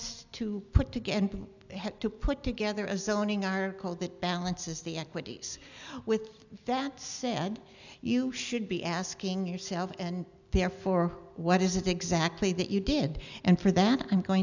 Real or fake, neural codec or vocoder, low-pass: real; none; 7.2 kHz